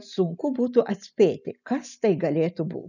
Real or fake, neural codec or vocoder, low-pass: real; none; 7.2 kHz